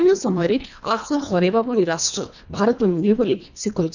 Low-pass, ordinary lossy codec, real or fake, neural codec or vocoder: 7.2 kHz; none; fake; codec, 24 kHz, 1.5 kbps, HILCodec